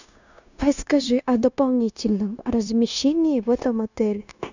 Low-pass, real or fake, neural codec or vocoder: 7.2 kHz; fake; codec, 16 kHz, 0.9 kbps, LongCat-Audio-Codec